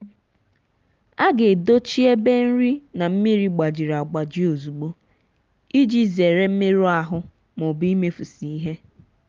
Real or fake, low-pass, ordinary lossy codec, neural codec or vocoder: real; 7.2 kHz; Opus, 32 kbps; none